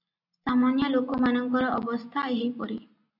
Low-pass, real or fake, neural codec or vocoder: 5.4 kHz; real; none